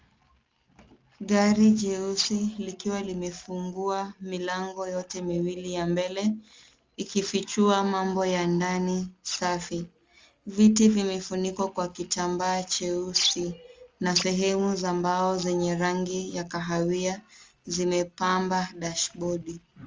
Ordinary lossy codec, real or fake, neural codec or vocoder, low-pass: Opus, 32 kbps; real; none; 7.2 kHz